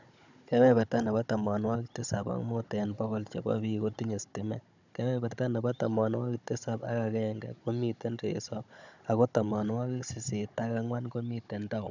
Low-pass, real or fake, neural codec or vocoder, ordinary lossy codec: 7.2 kHz; fake; codec, 16 kHz, 16 kbps, FunCodec, trained on Chinese and English, 50 frames a second; none